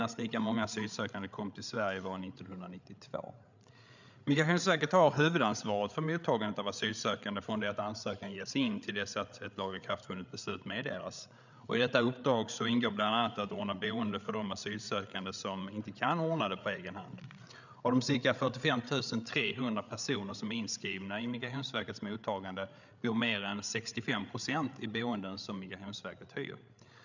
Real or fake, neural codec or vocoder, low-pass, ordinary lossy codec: fake; codec, 16 kHz, 8 kbps, FreqCodec, larger model; 7.2 kHz; none